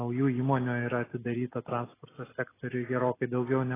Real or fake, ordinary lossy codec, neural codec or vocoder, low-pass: real; AAC, 16 kbps; none; 3.6 kHz